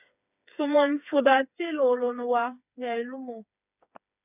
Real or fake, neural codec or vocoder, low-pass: fake; codec, 16 kHz, 4 kbps, FreqCodec, smaller model; 3.6 kHz